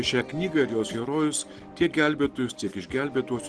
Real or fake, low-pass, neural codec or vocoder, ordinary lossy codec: real; 10.8 kHz; none; Opus, 16 kbps